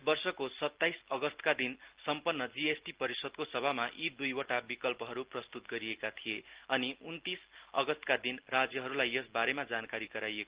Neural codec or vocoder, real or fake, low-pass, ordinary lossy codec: none; real; 3.6 kHz; Opus, 16 kbps